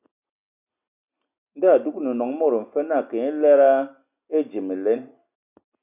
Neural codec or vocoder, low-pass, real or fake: none; 3.6 kHz; real